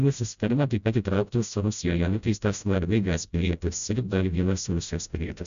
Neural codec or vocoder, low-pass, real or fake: codec, 16 kHz, 0.5 kbps, FreqCodec, smaller model; 7.2 kHz; fake